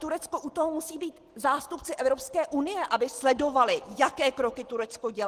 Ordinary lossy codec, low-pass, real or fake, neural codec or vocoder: Opus, 16 kbps; 14.4 kHz; real; none